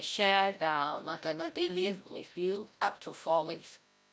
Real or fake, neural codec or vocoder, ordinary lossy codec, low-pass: fake; codec, 16 kHz, 0.5 kbps, FreqCodec, larger model; none; none